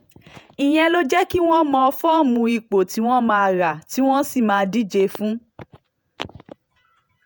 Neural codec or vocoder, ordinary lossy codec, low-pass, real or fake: vocoder, 48 kHz, 128 mel bands, Vocos; none; none; fake